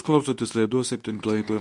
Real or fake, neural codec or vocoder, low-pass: fake; codec, 24 kHz, 0.9 kbps, WavTokenizer, medium speech release version 2; 10.8 kHz